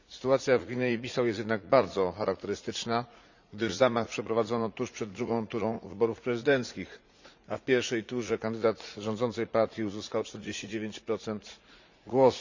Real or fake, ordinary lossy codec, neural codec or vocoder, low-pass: fake; Opus, 64 kbps; vocoder, 44.1 kHz, 80 mel bands, Vocos; 7.2 kHz